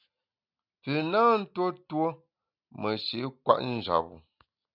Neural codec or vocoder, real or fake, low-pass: none; real; 5.4 kHz